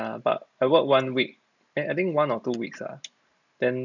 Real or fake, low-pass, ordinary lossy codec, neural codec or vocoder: real; 7.2 kHz; none; none